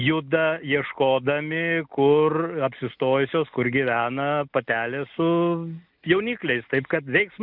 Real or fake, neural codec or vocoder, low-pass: real; none; 5.4 kHz